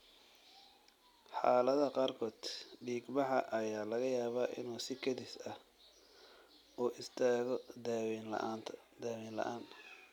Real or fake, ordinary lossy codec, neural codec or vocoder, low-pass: real; none; none; 19.8 kHz